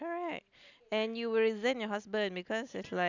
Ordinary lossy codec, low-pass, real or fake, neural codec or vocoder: none; 7.2 kHz; real; none